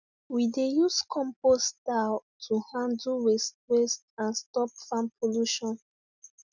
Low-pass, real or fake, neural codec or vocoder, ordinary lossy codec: 7.2 kHz; real; none; none